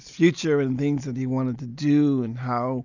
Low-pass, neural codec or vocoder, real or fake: 7.2 kHz; none; real